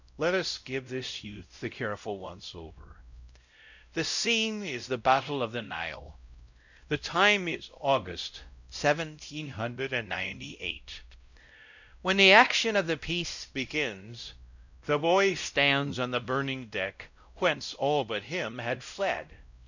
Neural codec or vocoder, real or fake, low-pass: codec, 16 kHz, 0.5 kbps, X-Codec, WavLM features, trained on Multilingual LibriSpeech; fake; 7.2 kHz